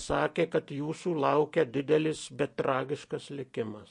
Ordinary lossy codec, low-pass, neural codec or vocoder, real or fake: MP3, 48 kbps; 10.8 kHz; none; real